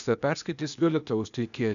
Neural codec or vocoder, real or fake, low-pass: codec, 16 kHz, 0.8 kbps, ZipCodec; fake; 7.2 kHz